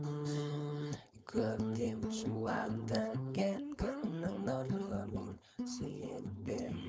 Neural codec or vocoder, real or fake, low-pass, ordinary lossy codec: codec, 16 kHz, 4.8 kbps, FACodec; fake; none; none